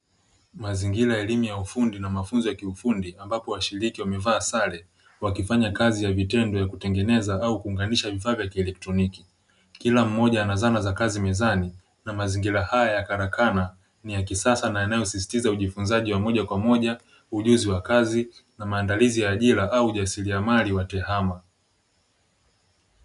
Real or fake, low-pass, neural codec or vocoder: real; 10.8 kHz; none